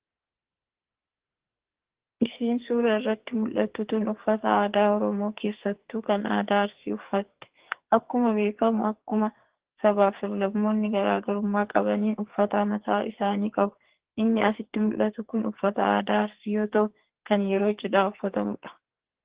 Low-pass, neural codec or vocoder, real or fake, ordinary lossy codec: 3.6 kHz; codec, 44.1 kHz, 2.6 kbps, SNAC; fake; Opus, 16 kbps